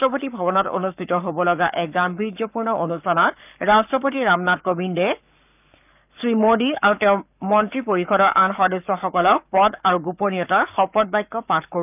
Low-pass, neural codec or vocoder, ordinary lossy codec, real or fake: 3.6 kHz; codec, 44.1 kHz, 7.8 kbps, Pupu-Codec; none; fake